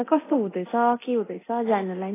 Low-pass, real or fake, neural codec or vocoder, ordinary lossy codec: 3.6 kHz; fake; codec, 24 kHz, 0.9 kbps, DualCodec; AAC, 16 kbps